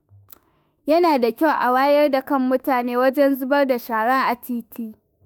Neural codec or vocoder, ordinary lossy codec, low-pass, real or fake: autoencoder, 48 kHz, 32 numbers a frame, DAC-VAE, trained on Japanese speech; none; none; fake